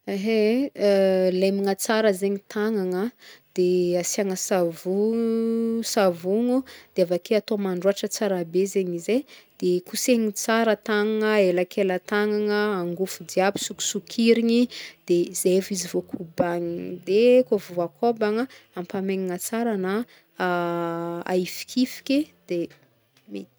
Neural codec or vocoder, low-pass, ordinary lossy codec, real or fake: none; none; none; real